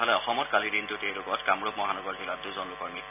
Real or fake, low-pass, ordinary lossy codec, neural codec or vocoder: real; 3.6 kHz; none; none